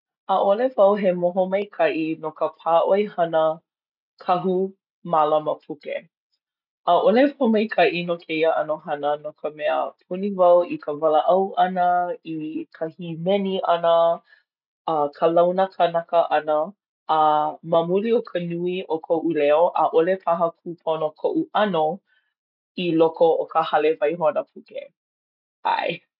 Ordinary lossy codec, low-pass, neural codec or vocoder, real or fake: none; 5.4 kHz; none; real